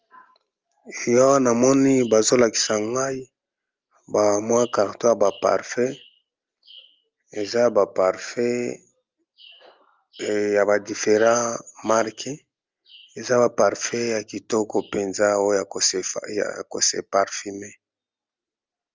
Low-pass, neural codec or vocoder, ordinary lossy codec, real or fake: 7.2 kHz; none; Opus, 32 kbps; real